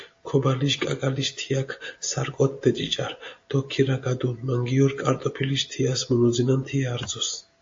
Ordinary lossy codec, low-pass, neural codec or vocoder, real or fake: AAC, 48 kbps; 7.2 kHz; none; real